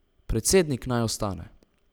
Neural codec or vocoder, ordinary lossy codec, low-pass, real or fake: none; none; none; real